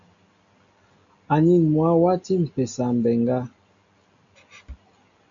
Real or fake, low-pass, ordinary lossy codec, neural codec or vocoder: real; 7.2 kHz; MP3, 64 kbps; none